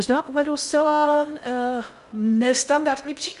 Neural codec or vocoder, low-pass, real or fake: codec, 16 kHz in and 24 kHz out, 0.6 kbps, FocalCodec, streaming, 2048 codes; 10.8 kHz; fake